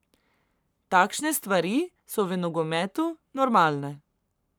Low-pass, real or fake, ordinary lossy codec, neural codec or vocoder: none; fake; none; codec, 44.1 kHz, 7.8 kbps, Pupu-Codec